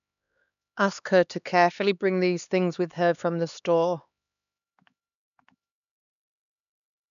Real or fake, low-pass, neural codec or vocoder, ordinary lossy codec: fake; 7.2 kHz; codec, 16 kHz, 2 kbps, X-Codec, HuBERT features, trained on LibriSpeech; none